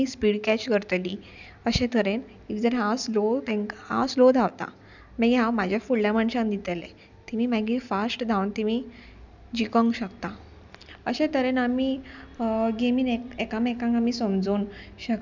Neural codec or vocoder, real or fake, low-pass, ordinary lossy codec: none; real; 7.2 kHz; none